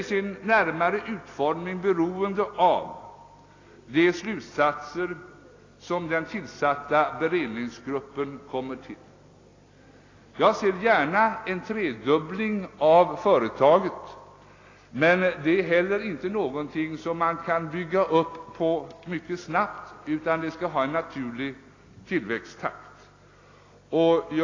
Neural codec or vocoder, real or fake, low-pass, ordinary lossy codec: none; real; 7.2 kHz; AAC, 32 kbps